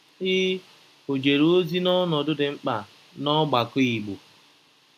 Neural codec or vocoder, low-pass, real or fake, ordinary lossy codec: none; 14.4 kHz; real; none